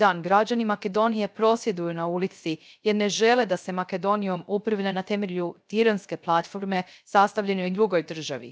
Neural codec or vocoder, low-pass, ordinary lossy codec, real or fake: codec, 16 kHz, 0.3 kbps, FocalCodec; none; none; fake